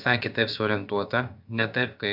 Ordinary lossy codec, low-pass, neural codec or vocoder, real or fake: AAC, 48 kbps; 5.4 kHz; codec, 16 kHz, about 1 kbps, DyCAST, with the encoder's durations; fake